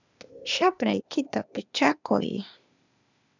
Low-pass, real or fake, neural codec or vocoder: 7.2 kHz; fake; codec, 16 kHz, 0.8 kbps, ZipCodec